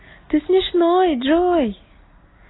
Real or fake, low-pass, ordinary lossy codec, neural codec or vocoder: real; 7.2 kHz; AAC, 16 kbps; none